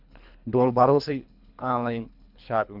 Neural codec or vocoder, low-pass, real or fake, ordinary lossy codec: codec, 24 kHz, 3 kbps, HILCodec; 5.4 kHz; fake; AAC, 48 kbps